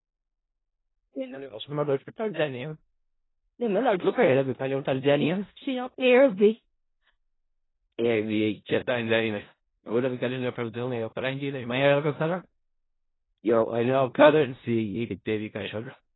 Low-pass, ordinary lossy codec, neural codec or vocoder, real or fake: 7.2 kHz; AAC, 16 kbps; codec, 16 kHz in and 24 kHz out, 0.4 kbps, LongCat-Audio-Codec, four codebook decoder; fake